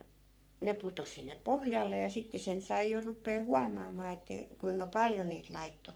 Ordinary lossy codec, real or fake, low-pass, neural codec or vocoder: none; fake; none; codec, 44.1 kHz, 3.4 kbps, Pupu-Codec